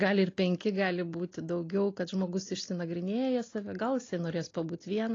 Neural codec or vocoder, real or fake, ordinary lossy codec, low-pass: none; real; AAC, 32 kbps; 7.2 kHz